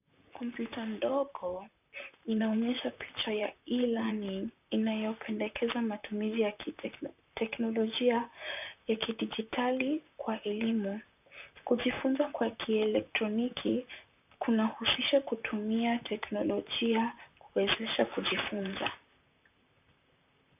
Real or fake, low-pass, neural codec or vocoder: fake; 3.6 kHz; vocoder, 44.1 kHz, 128 mel bands, Pupu-Vocoder